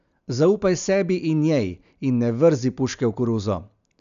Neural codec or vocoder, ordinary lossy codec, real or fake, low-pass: none; none; real; 7.2 kHz